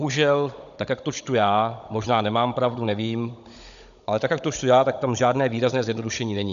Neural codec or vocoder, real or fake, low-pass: codec, 16 kHz, 16 kbps, FunCodec, trained on Chinese and English, 50 frames a second; fake; 7.2 kHz